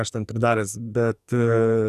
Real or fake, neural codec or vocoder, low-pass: fake; codec, 44.1 kHz, 2.6 kbps, SNAC; 14.4 kHz